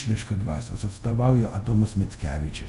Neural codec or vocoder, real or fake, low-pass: codec, 24 kHz, 0.5 kbps, DualCodec; fake; 10.8 kHz